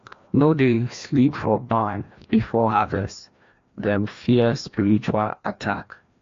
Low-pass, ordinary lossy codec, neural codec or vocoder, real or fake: 7.2 kHz; AAC, 48 kbps; codec, 16 kHz, 1 kbps, FreqCodec, larger model; fake